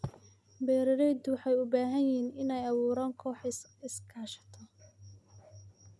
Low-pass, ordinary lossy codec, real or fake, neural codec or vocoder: none; none; real; none